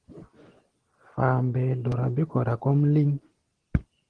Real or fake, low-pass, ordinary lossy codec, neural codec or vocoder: real; 9.9 kHz; Opus, 16 kbps; none